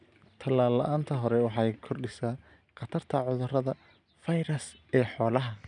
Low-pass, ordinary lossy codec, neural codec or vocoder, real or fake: 9.9 kHz; none; none; real